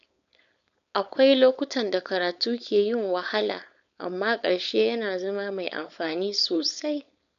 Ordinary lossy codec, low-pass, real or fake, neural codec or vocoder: none; 7.2 kHz; fake; codec, 16 kHz, 4.8 kbps, FACodec